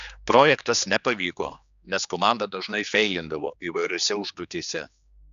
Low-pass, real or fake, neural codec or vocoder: 7.2 kHz; fake; codec, 16 kHz, 2 kbps, X-Codec, HuBERT features, trained on general audio